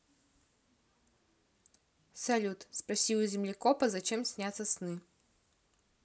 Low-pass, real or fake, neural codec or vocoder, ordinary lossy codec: none; real; none; none